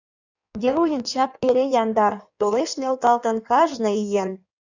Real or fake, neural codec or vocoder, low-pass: fake; codec, 16 kHz in and 24 kHz out, 1.1 kbps, FireRedTTS-2 codec; 7.2 kHz